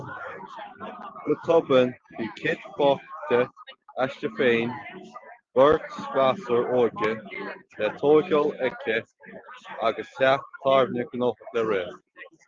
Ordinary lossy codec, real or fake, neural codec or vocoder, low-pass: Opus, 32 kbps; real; none; 7.2 kHz